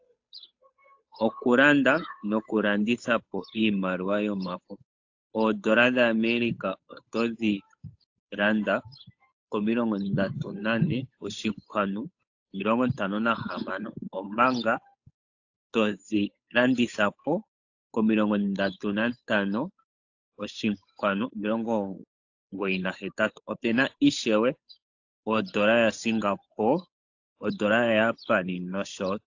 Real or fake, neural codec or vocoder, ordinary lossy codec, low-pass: fake; codec, 16 kHz, 8 kbps, FunCodec, trained on Chinese and English, 25 frames a second; AAC, 48 kbps; 7.2 kHz